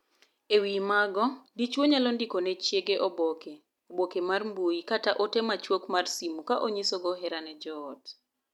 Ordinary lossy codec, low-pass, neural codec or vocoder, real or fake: none; 19.8 kHz; none; real